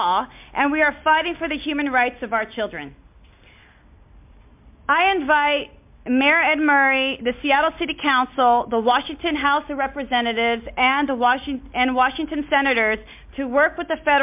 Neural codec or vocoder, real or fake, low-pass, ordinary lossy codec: none; real; 3.6 kHz; MP3, 32 kbps